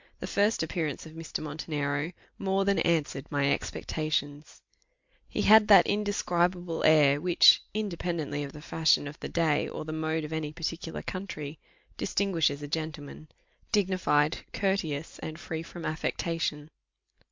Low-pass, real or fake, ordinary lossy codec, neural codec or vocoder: 7.2 kHz; real; MP3, 64 kbps; none